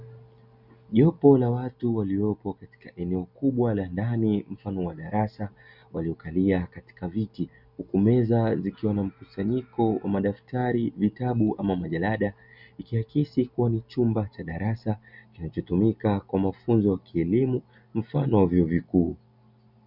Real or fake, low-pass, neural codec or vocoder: real; 5.4 kHz; none